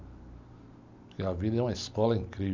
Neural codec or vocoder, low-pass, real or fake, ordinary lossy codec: none; 7.2 kHz; real; MP3, 64 kbps